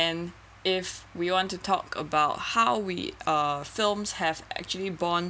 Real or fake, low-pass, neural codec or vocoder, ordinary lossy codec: real; none; none; none